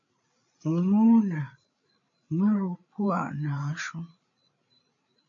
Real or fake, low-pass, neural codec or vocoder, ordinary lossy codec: fake; 7.2 kHz; codec, 16 kHz, 8 kbps, FreqCodec, larger model; AAC, 48 kbps